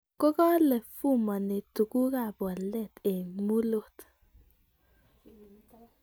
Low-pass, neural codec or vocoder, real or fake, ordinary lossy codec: none; none; real; none